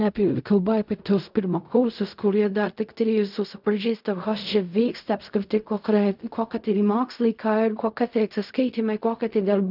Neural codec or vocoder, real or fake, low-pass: codec, 16 kHz in and 24 kHz out, 0.4 kbps, LongCat-Audio-Codec, fine tuned four codebook decoder; fake; 5.4 kHz